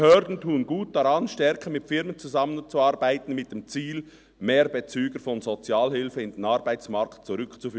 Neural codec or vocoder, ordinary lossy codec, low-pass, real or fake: none; none; none; real